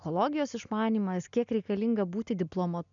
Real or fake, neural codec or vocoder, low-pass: real; none; 7.2 kHz